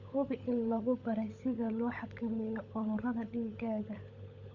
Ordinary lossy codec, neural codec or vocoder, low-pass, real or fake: none; codec, 16 kHz, 16 kbps, FunCodec, trained on LibriTTS, 50 frames a second; 7.2 kHz; fake